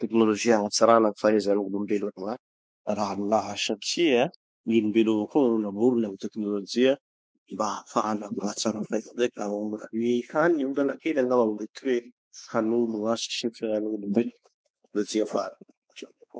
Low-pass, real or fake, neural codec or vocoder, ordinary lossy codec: none; fake; codec, 16 kHz, 4 kbps, X-Codec, WavLM features, trained on Multilingual LibriSpeech; none